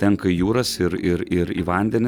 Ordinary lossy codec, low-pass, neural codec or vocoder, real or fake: Opus, 64 kbps; 19.8 kHz; none; real